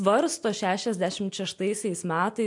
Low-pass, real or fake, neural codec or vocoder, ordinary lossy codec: 10.8 kHz; real; none; MP3, 64 kbps